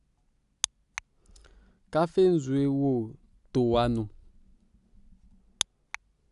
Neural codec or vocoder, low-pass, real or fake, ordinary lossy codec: none; 10.8 kHz; real; none